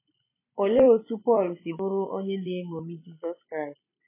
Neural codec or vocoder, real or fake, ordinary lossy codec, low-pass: vocoder, 44.1 kHz, 128 mel bands every 256 samples, BigVGAN v2; fake; MP3, 16 kbps; 3.6 kHz